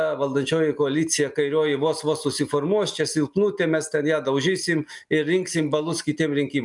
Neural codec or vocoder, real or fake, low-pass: none; real; 10.8 kHz